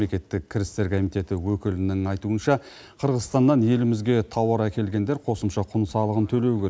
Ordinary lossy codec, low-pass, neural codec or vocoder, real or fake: none; none; none; real